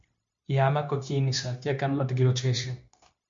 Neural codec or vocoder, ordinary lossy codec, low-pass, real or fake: codec, 16 kHz, 0.9 kbps, LongCat-Audio-Codec; MP3, 48 kbps; 7.2 kHz; fake